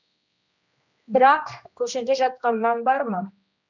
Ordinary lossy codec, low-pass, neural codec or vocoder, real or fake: none; 7.2 kHz; codec, 16 kHz, 1 kbps, X-Codec, HuBERT features, trained on general audio; fake